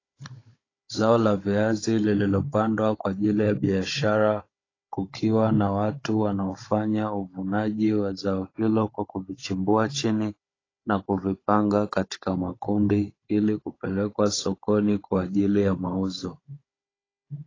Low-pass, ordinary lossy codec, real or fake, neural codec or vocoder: 7.2 kHz; AAC, 32 kbps; fake; codec, 16 kHz, 16 kbps, FunCodec, trained on Chinese and English, 50 frames a second